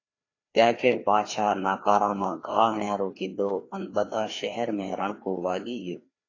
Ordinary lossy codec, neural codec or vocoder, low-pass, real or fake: AAC, 32 kbps; codec, 16 kHz, 2 kbps, FreqCodec, larger model; 7.2 kHz; fake